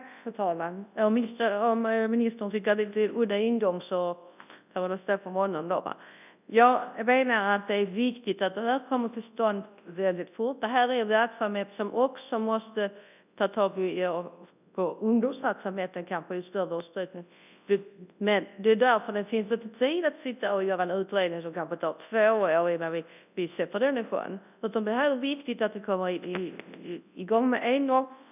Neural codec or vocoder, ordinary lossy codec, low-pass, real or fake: codec, 24 kHz, 0.9 kbps, WavTokenizer, large speech release; none; 3.6 kHz; fake